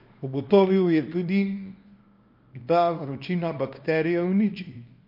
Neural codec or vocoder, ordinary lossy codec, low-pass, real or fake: codec, 24 kHz, 0.9 kbps, WavTokenizer, small release; MP3, 32 kbps; 5.4 kHz; fake